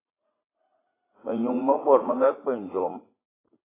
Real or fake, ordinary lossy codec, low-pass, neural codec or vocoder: fake; AAC, 16 kbps; 3.6 kHz; vocoder, 44.1 kHz, 80 mel bands, Vocos